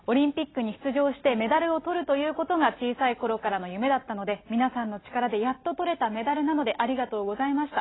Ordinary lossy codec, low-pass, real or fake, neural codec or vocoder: AAC, 16 kbps; 7.2 kHz; real; none